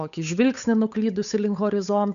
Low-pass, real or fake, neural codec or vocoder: 7.2 kHz; fake; codec, 16 kHz, 8 kbps, FunCodec, trained on Chinese and English, 25 frames a second